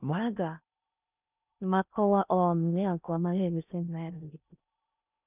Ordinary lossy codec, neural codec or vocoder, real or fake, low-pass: none; codec, 16 kHz in and 24 kHz out, 0.6 kbps, FocalCodec, streaming, 4096 codes; fake; 3.6 kHz